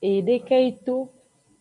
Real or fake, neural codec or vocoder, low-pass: real; none; 10.8 kHz